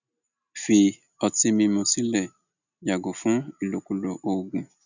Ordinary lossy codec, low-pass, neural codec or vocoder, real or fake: none; 7.2 kHz; none; real